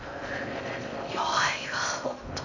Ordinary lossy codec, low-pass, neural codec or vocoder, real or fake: none; 7.2 kHz; codec, 16 kHz in and 24 kHz out, 0.6 kbps, FocalCodec, streaming, 4096 codes; fake